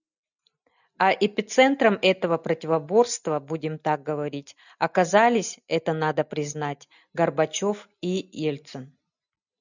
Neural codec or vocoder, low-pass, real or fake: none; 7.2 kHz; real